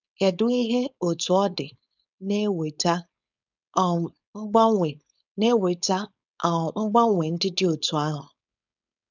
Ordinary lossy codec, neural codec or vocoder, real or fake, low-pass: none; codec, 16 kHz, 4.8 kbps, FACodec; fake; 7.2 kHz